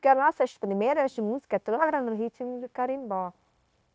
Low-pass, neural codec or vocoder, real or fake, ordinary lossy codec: none; codec, 16 kHz, 0.9 kbps, LongCat-Audio-Codec; fake; none